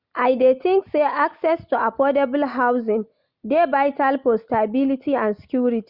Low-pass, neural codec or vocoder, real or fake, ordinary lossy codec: 5.4 kHz; none; real; Opus, 64 kbps